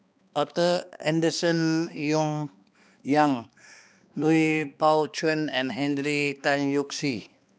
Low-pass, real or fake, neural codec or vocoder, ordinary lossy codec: none; fake; codec, 16 kHz, 2 kbps, X-Codec, HuBERT features, trained on balanced general audio; none